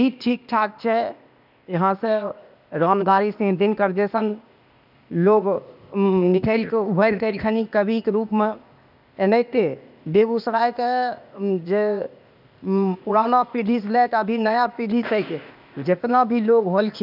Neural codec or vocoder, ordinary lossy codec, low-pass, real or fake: codec, 16 kHz, 0.8 kbps, ZipCodec; none; 5.4 kHz; fake